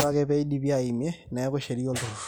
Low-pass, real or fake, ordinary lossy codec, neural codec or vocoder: none; real; none; none